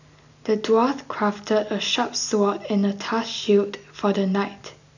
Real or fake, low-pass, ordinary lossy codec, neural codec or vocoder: real; 7.2 kHz; none; none